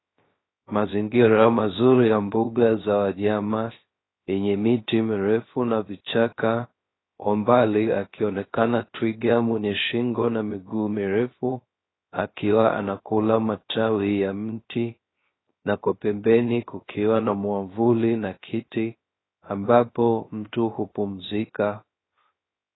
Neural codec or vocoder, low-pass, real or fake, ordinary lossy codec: codec, 16 kHz, 0.3 kbps, FocalCodec; 7.2 kHz; fake; AAC, 16 kbps